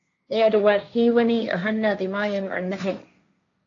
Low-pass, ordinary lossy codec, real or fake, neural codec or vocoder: 7.2 kHz; AAC, 48 kbps; fake; codec, 16 kHz, 1.1 kbps, Voila-Tokenizer